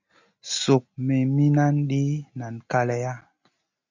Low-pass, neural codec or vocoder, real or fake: 7.2 kHz; none; real